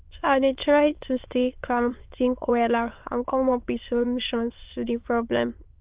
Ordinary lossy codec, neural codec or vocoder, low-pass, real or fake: Opus, 32 kbps; autoencoder, 22.05 kHz, a latent of 192 numbers a frame, VITS, trained on many speakers; 3.6 kHz; fake